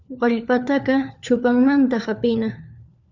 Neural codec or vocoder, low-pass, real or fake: codec, 16 kHz, 4 kbps, FunCodec, trained on LibriTTS, 50 frames a second; 7.2 kHz; fake